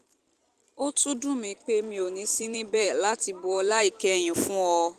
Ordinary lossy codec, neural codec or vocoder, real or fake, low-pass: Opus, 24 kbps; vocoder, 44.1 kHz, 128 mel bands every 256 samples, BigVGAN v2; fake; 14.4 kHz